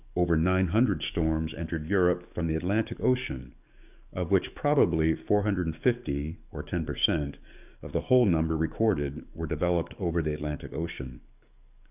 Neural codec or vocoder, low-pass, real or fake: codec, 44.1 kHz, 7.8 kbps, DAC; 3.6 kHz; fake